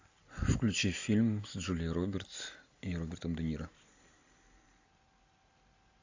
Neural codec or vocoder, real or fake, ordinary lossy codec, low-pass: none; real; AAC, 48 kbps; 7.2 kHz